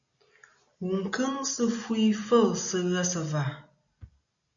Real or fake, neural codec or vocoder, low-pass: real; none; 7.2 kHz